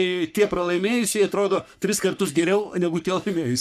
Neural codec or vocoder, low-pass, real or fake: codec, 44.1 kHz, 3.4 kbps, Pupu-Codec; 14.4 kHz; fake